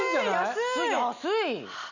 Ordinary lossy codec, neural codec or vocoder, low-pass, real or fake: none; none; 7.2 kHz; real